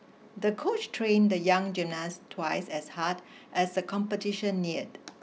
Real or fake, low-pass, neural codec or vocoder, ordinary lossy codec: real; none; none; none